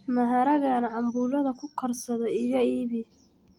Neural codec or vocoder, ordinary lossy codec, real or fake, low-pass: none; Opus, 24 kbps; real; 19.8 kHz